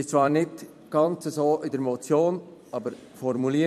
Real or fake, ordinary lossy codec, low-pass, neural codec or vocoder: fake; MP3, 64 kbps; 14.4 kHz; vocoder, 44.1 kHz, 128 mel bands every 256 samples, BigVGAN v2